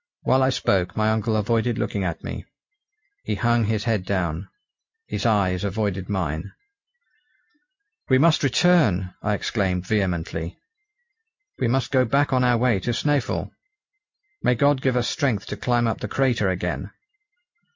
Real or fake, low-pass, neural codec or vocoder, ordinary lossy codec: real; 7.2 kHz; none; MP3, 48 kbps